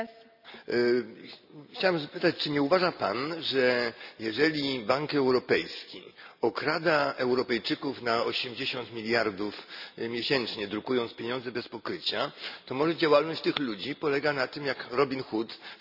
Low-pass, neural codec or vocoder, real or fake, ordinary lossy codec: 5.4 kHz; none; real; none